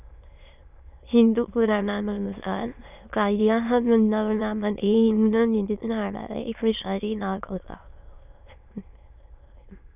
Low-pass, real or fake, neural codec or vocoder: 3.6 kHz; fake; autoencoder, 22.05 kHz, a latent of 192 numbers a frame, VITS, trained on many speakers